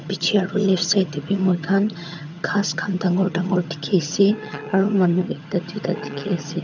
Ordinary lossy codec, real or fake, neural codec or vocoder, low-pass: none; fake; vocoder, 22.05 kHz, 80 mel bands, HiFi-GAN; 7.2 kHz